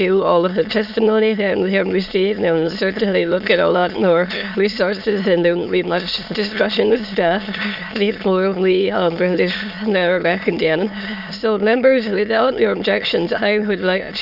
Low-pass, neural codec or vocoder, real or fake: 5.4 kHz; autoencoder, 22.05 kHz, a latent of 192 numbers a frame, VITS, trained on many speakers; fake